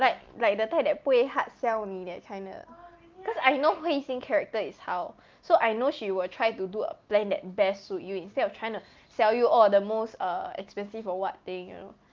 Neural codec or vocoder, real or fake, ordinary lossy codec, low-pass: none; real; Opus, 32 kbps; 7.2 kHz